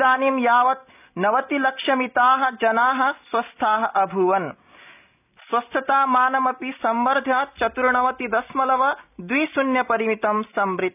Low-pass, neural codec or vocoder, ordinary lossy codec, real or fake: 3.6 kHz; none; none; real